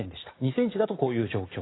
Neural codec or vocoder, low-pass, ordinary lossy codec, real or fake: none; 7.2 kHz; AAC, 16 kbps; real